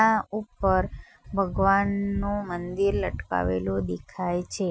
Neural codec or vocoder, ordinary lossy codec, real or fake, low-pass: none; none; real; none